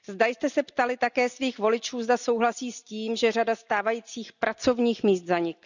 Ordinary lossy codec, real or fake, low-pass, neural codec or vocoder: none; real; 7.2 kHz; none